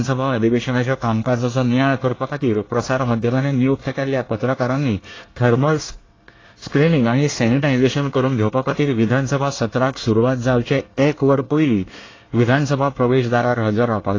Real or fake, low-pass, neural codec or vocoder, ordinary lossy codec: fake; 7.2 kHz; codec, 24 kHz, 1 kbps, SNAC; AAC, 32 kbps